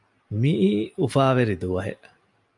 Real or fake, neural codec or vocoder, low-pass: real; none; 10.8 kHz